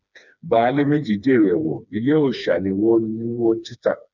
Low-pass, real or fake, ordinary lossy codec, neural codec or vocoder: 7.2 kHz; fake; none; codec, 16 kHz, 2 kbps, FreqCodec, smaller model